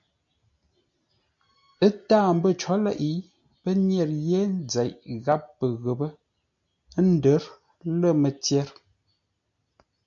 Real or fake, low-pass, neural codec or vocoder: real; 7.2 kHz; none